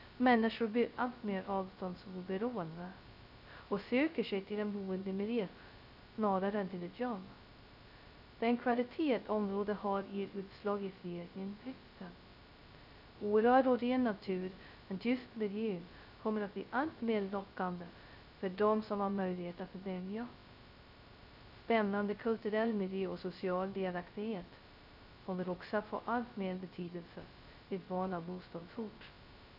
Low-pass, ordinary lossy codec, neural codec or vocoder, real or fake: 5.4 kHz; none; codec, 16 kHz, 0.2 kbps, FocalCodec; fake